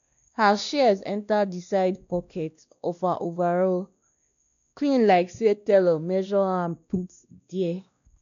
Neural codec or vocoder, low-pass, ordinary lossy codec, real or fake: codec, 16 kHz, 1 kbps, X-Codec, WavLM features, trained on Multilingual LibriSpeech; 7.2 kHz; none; fake